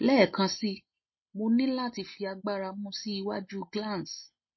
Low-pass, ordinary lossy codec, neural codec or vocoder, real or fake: 7.2 kHz; MP3, 24 kbps; none; real